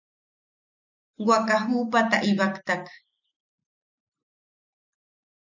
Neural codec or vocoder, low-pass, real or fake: none; 7.2 kHz; real